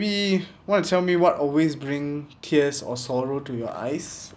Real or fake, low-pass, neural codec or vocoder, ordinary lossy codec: real; none; none; none